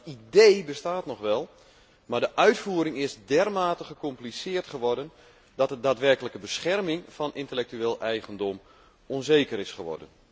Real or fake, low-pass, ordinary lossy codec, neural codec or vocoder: real; none; none; none